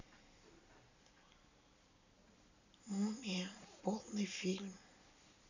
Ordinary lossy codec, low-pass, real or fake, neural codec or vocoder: none; 7.2 kHz; real; none